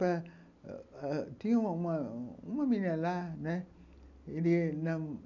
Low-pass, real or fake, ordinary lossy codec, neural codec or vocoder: 7.2 kHz; real; none; none